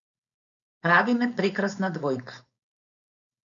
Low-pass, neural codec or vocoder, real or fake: 7.2 kHz; codec, 16 kHz, 4.8 kbps, FACodec; fake